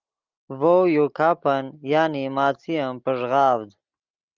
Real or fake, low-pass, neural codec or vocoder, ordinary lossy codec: real; 7.2 kHz; none; Opus, 24 kbps